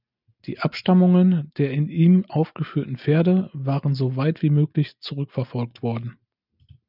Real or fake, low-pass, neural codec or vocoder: real; 5.4 kHz; none